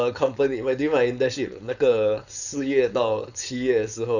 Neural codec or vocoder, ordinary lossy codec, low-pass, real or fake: codec, 16 kHz, 4.8 kbps, FACodec; none; 7.2 kHz; fake